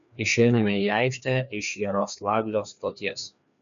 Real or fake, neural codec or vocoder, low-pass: fake; codec, 16 kHz, 2 kbps, FreqCodec, larger model; 7.2 kHz